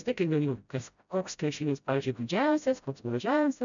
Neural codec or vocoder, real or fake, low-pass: codec, 16 kHz, 0.5 kbps, FreqCodec, smaller model; fake; 7.2 kHz